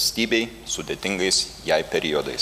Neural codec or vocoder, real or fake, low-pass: none; real; 14.4 kHz